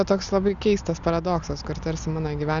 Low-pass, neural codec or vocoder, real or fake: 7.2 kHz; none; real